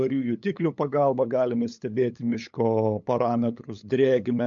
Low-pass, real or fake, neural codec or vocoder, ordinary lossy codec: 7.2 kHz; fake; codec, 16 kHz, 8 kbps, FunCodec, trained on LibriTTS, 25 frames a second; AAC, 64 kbps